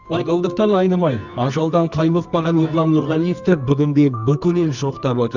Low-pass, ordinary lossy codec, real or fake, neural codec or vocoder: 7.2 kHz; none; fake; codec, 24 kHz, 0.9 kbps, WavTokenizer, medium music audio release